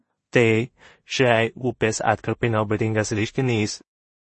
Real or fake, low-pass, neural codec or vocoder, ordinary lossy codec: fake; 10.8 kHz; codec, 16 kHz in and 24 kHz out, 0.4 kbps, LongCat-Audio-Codec, two codebook decoder; MP3, 32 kbps